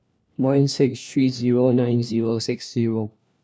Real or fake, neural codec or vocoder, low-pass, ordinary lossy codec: fake; codec, 16 kHz, 1 kbps, FunCodec, trained on LibriTTS, 50 frames a second; none; none